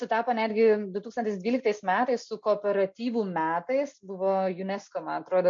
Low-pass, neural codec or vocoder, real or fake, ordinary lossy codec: 7.2 kHz; none; real; MP3, 48 kbps